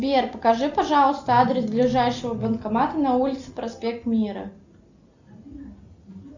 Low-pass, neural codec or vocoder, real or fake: 7.2 kHz; none; real